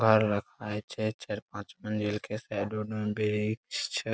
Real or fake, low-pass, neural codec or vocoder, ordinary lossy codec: real; none; none; none